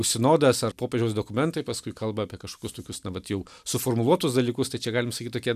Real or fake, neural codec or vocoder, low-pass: real; none; 14.4 kHz